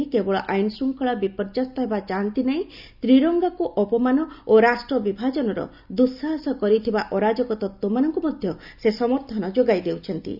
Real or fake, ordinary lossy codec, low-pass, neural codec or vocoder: real; none; 5.4 kHz; none